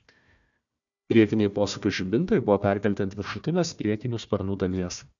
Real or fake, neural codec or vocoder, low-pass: fake; codec, 16 kHz, 1 kbps, FunCodec, trained on Chinese and English, 50 frames a second; 7.2 kHz